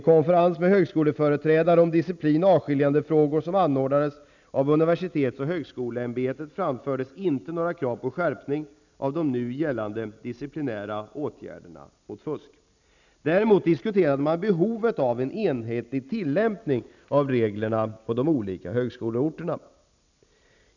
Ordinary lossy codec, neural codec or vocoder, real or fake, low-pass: none; none; real; 7.2 kHz